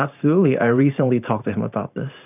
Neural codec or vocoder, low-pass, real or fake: vocoder, 44.1 kHz, 128 mel bands every 512 samples, BigVGAN v2; 3.6 kHz; fake